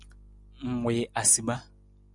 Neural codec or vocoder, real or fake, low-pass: none; real; 10.8 kHz